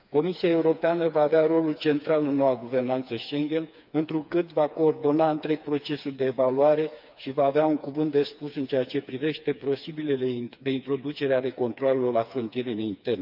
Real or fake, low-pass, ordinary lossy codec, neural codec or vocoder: fake; 5.4 kHz; none; codec, 16 kHz, 4 kbps, FreqCodec, smaller model